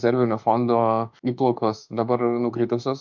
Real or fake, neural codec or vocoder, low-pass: fake; autoencoder, 48 kHz, 32 numbers a frame, DAC-VAE, trained on Japanese speech; 7.2 kHz